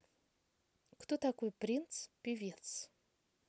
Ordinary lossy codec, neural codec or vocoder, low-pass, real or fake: none; none; none; real